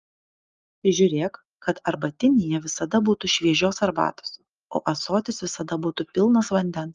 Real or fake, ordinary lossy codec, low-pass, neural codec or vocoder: real; Opus, 32 kbps; 7.2 kHz; none